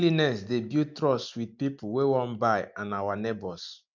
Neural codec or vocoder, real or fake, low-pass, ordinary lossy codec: none; real; 7.2 kHz; none